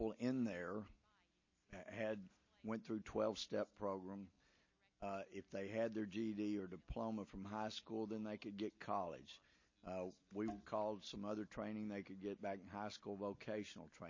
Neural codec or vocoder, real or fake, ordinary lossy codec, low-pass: none; real; MP3, 32 kbps; 7.2 kHz